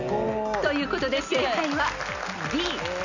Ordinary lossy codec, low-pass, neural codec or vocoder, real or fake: none; 7.2 kHz; none; real